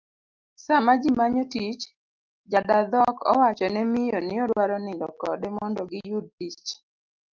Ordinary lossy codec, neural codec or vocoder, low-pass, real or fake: Opus, 32 kbps; none; 7.2 kHz; real